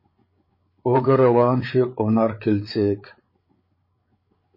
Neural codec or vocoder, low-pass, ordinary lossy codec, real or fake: codec, 16 kHz, 8 kbps, FreqCodec, larger model; 5.4 kHz; MP3, 24 kbps; fake